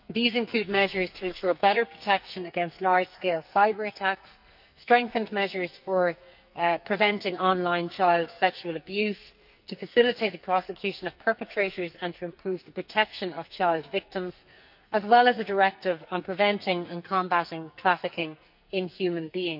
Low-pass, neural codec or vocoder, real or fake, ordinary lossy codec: 5.4 kHz; codec, 44.1 kHz, 2.6 kbps, SNAC; fake; none